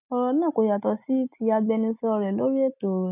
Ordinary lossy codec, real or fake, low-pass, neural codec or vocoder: none; real; 3.6 kHz; none